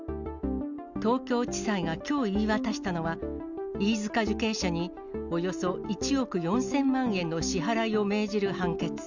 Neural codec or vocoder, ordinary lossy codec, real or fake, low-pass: none; none; real; 7.2 kHz